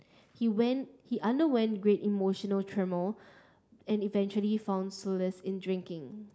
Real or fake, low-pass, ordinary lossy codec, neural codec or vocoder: real; none; none; none